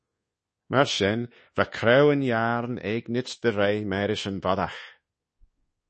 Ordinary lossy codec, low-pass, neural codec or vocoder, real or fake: MP3, 32 kbps; 10.8 kHz; codec, 24 kHz, 1.2 kbps, DualCodec; fake